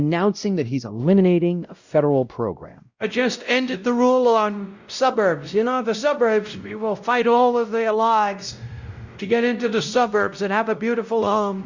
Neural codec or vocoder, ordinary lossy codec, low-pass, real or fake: codec, 16 kHz, 0.5 kbps, X-Codec, WavLM features, trained on Multilingual LibriSpeech; Opus, 64 kbps; 7.2 kHz; fake